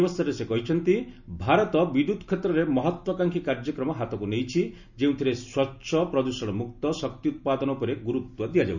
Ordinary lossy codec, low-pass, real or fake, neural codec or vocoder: none; 7.2 kHz; real; none